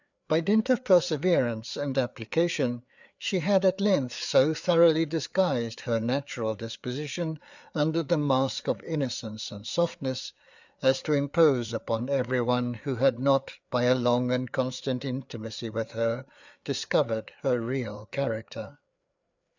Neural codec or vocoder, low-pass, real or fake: codec, 16 kHz, 4 kbps, FreqCodec, larger model; 7.2 kHz; fake